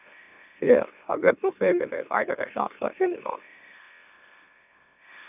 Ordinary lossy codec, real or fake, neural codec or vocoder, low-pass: none; fake; autoencoder, 44.1 kHz, a latent of 192 numbers a frame, MeloTTS; 3.6 kHz